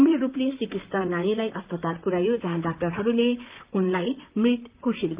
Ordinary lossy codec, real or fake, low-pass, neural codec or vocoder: Opus, 24 kbps; fake; 3.6 kHz; vocoder, 44.1 kHz, 128 mel bands, Pupu-Vocoder